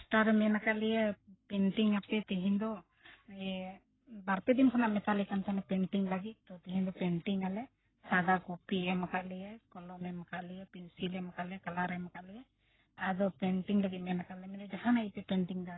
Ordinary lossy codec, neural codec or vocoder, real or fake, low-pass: AAC, 16 kbps; codec, 44.1 kHz, 7.8 kbps, Pupu-Codec; fake; 7.2 kHz